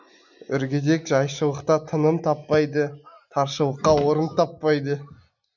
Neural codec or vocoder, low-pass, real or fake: none; 7.2 kHz; real